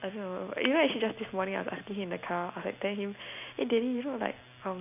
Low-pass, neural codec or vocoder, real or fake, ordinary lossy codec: 3.6 kHz; none; real; none